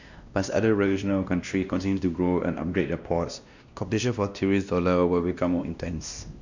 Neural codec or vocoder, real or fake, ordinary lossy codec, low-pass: codec, 16 kHz, 1 kbps, X-Codec, WavLM features, trained on Multilingual LibriSpeech; fake; none; 7.2 kHz